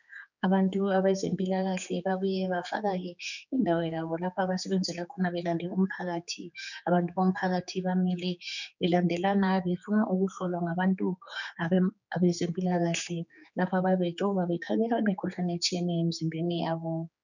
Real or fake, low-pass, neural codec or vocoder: fake; 7.2 kHz; codec, 16 kHz, 4 kbps, X-Codec, HuBERT features, trained on general audio